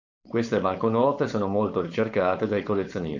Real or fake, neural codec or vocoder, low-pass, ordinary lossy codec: fake; codec, 16 kHz, 4.8 kbps, FACodec; 7.2 kHz; MP3, 64 kbps